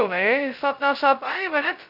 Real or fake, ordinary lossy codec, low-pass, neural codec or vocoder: fake; none; 5.4 kHz; codec, 16 kHz, 0.2 kbps, FocalCodec